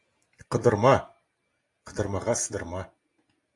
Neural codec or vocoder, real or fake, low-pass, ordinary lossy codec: none; real; 10.8 kHz; AAC, 64 kbps